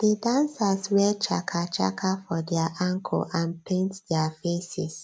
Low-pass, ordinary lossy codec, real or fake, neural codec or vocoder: none; none; real; none